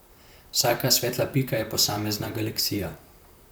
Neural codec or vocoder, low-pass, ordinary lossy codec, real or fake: vocoder, 44.1 kHz, 128 mel bands, Pupu-Vocoder; none; none; fake